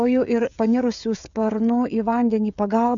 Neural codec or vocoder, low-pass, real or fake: codec, 16 kHz, 16 kbps, FreqCodec, smaller model; 7.2 kHz; fake